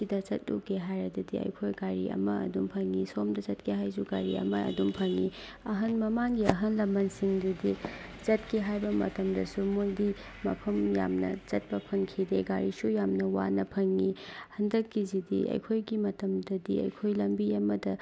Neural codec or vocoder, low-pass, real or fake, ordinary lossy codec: none; none; real; none